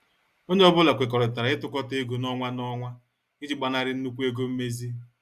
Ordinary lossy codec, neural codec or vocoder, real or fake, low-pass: Opus, 64 kbps; none; real; 14.4 kHz